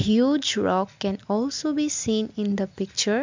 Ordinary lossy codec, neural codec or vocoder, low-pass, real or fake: MP3, 64 kbps; none; 7.2 kHz; real